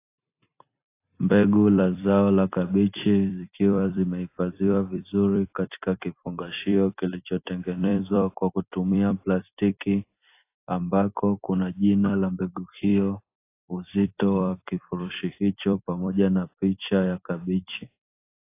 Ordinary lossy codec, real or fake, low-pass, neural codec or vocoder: AAC, 24 kbps; fake; 3.6 kHz; vocoder, 44.1 kHz, 128 mel bands every 256 samples, BigVGAN v2